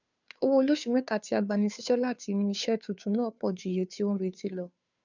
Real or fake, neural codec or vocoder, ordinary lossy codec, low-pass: fake; codec, 16 kHz, 2 kbps, FunCodec, trained on Chinese and English, 25 frames a second; none; 7.2 kHz